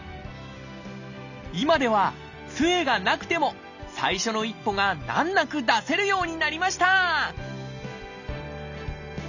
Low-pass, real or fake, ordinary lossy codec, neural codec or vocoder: 7.2 kHz; real; none; none